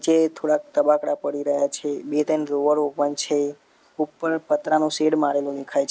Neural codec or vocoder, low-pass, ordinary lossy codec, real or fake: none; none; none; real